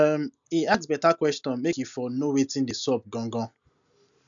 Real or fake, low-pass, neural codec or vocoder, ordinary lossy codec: real; 7.2 kHz; none; none